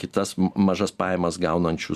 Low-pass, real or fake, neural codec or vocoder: 14.4 kHz; real; none